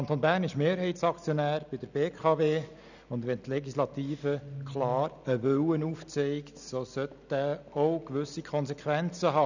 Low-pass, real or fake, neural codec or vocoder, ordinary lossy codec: 7.2 kHz; real; none; none